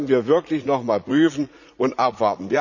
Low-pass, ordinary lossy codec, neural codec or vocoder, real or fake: 7.2 kHz; none; vocoder, 44.1 kHz, 80 mel bands, Vocos; fake